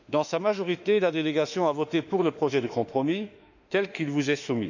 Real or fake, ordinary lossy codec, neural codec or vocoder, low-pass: fake; none; autoencoder, 48 kHz, 32 numbers a frame, DAC-VAE, trained on Japanese speech; 7.2 kHz